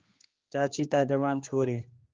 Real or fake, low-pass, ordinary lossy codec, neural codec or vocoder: fake; 7.2 kHz; Opus, 24 kbps; codec, 16 kHz, 2 kbps, X-Codec, HuBERT features, trained on general audio